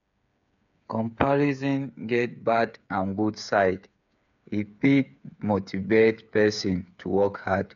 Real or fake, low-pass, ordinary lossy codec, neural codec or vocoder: fake; 7.2 kHz; none; codec, 16 kHz, 8 kbps, FreqCodec, smaller model